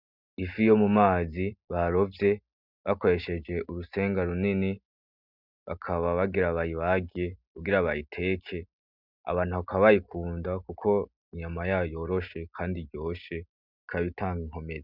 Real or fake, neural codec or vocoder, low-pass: real; none; 5.4 kHz